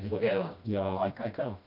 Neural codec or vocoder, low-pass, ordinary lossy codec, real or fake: codec, 16 kHz, 1 kbps, FreqCodec, smaller model; 5.4 kHz; none; fake